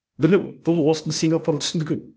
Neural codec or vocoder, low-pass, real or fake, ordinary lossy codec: codec, 16 kHz, 0.8 kbps, ZipCodec; none; fake; none